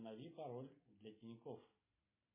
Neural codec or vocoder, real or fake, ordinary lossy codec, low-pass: none; real; MP3, 16 kbps; 3.6 kHz